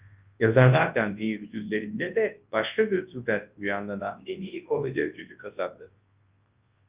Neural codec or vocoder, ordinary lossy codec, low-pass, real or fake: codec, 24 kHz, 0.9 kbps, WavTokenizer, large speech release; Opus, 32 kbps; 3.6 kHz; fake